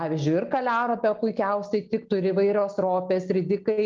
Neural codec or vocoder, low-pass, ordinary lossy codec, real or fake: none; 7.2 kHz; Opus, 24 kbps; real